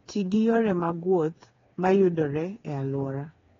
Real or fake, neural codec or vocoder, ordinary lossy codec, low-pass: fake; codec, 16 kHz, 4 kbps, FreqCodec, smaller model; AAC, 32 kbps; 7.2 kHz